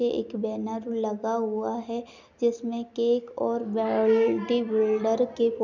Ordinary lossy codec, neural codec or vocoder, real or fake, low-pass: none; none; real; 7.2 kHz